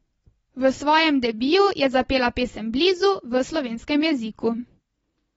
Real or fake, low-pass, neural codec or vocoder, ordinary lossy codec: real; 19.8 kHz; none; AAC, 24 kbps